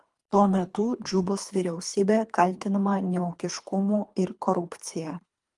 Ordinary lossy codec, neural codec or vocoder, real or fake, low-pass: Opus, 32 kbps; codec, 24 kHz, 3 kbps, HILCodec; fake; 10.8 kHz